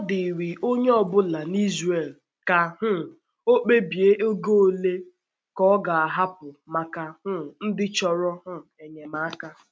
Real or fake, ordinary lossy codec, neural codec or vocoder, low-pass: real; none; none; none